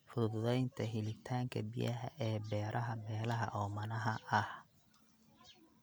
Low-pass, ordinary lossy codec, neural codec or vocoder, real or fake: none; none; none; real